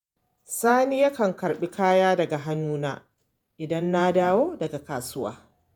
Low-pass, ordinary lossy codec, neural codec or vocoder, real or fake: none; none; vocoder, 48 kHz, 128 mel bands, Vocos; fake